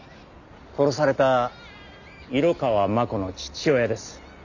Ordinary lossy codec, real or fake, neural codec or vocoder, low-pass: none; real; none; 7.2 kHz